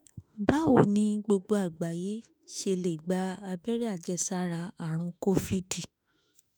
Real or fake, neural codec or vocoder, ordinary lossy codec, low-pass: fake; autoencoder, 48 kHz, 32 numbers a frame, DAC-VAE, trained on Japanese speech; none; none